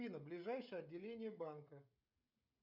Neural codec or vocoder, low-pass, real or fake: codec, 16 kHz, 16 kbps, FreqCodec, smaller model; 5.4 kHz; fake